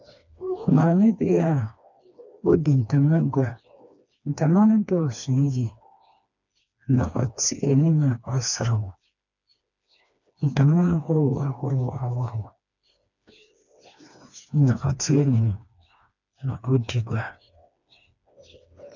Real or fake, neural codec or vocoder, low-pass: fake; codec, 16 kHz, 2 kbps, FreqCodec, smaller model; 7.2 kHz